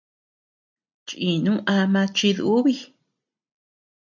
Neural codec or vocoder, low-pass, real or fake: none; 7.2 kHz; real